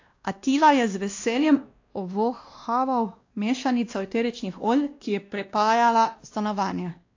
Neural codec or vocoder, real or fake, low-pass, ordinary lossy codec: codec, 16 kHz, 1 kbps, X-Codec, WavLM features, trained on Multilingual LibriSpeech; fake; 7.2 kHz; AAC, 48 kbps